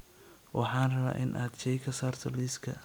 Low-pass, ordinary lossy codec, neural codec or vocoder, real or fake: none; none; none; real